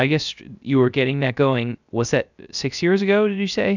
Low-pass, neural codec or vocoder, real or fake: 7.2 kHz; codec, 16 kHz, 0.3 kbps, FocalCodec; fake